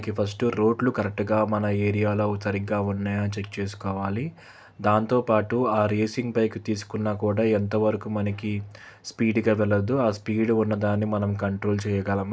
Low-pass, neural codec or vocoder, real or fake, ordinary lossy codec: none; none; real; none